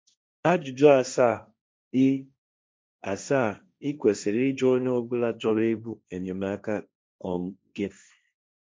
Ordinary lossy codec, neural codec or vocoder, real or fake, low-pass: none; codec, 16 kHz, 1.1 kbps, Voila-Tokenizer; fake; none